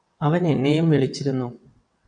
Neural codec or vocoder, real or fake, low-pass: vocoder, 22.05 kHz, 80 mel bands, WaveNeXt; fake; 9.9 kHz